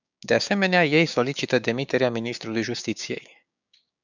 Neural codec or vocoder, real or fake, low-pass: codec, 16 kHz, 6 kbps, DAC; fake; 7.2 kHz